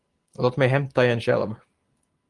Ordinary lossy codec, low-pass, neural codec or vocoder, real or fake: Opus, 24 kbps; 10.8 kHz; none; real